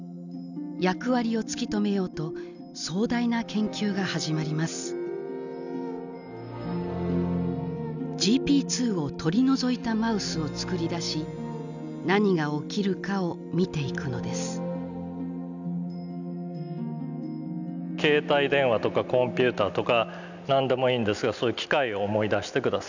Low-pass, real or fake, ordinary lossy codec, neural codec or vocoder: 7.2 kHz; real; none; none